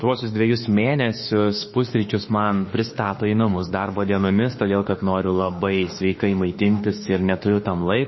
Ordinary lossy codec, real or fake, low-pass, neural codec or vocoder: MP3, 24 kbps; fake; 7.2 kHz; autoencoder, 48 kHz, 32 numbers a frame, DAC-VAE, trained on Japanese speech